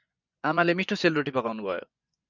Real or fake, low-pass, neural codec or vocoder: fake; 7.2 kHz; vocoder, 24 kHz, 100 mel bands, Vocos